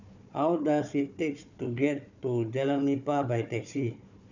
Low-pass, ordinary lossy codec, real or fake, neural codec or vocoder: 7.2 kHz; none; fake; codec, 16 kHz, 4 kbps, FunCodec, trained on Chinese and English, 50 frames a second